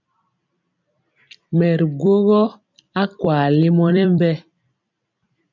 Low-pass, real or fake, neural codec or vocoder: 7.2 kHz; fake; vocoder, 44.1 kHz, 128 mel bands every 256 samples, BigVGAN v2